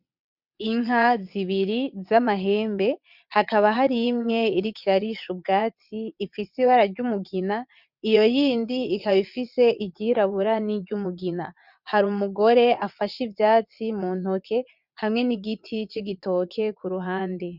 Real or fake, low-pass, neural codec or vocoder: fake; 5.4 kHz; vocoder, 22.05 kHz, 80 mel bands, WaveNeXt